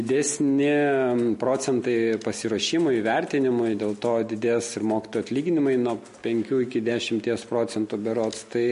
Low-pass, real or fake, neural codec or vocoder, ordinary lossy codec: 10.8 kHz; real; none; MP3, 48 kbps